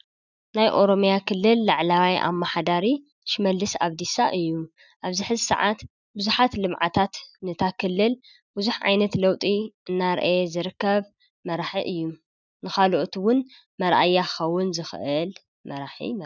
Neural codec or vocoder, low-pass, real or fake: none; 7.2 kHz; real